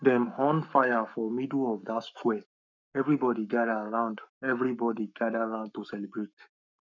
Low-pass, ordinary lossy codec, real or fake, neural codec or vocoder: 7.2 kHz; none; fake; codec, 44.1 kHz, 7.8 kbps, Pupu-Codec